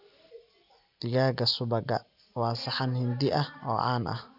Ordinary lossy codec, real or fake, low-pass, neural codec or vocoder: none; real; 5.4 kHz; none